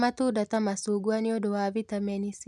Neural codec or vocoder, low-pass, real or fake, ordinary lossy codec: none; none; real; none